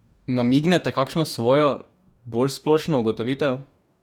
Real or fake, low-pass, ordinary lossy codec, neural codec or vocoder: fake; 19.8 kHz; none; codec, 44.1 kHz, 2.6 kbps, DAC